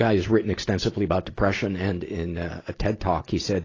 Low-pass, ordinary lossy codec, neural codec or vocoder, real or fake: 7.2 kHz; AAC, 32 kbps; none; real